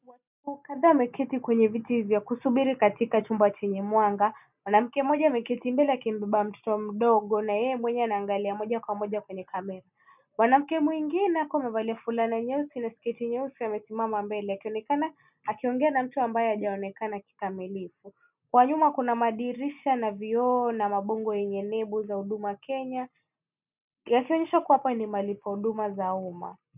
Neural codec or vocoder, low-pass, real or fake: none; 3.6 kHz; real